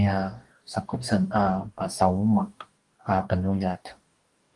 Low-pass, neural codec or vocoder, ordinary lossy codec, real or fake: 10.8 kHz; codec, 44.1 kHz, 2.6 kbps, DAC; Opus, 24 kbps; fake